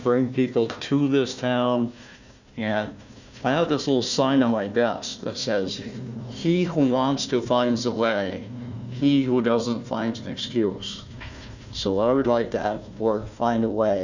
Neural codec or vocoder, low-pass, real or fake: codec, 16 kHz, 1 kbps, FunCodec, trained on Chinese and English, 50 frames a second; 7.2 kHz; fake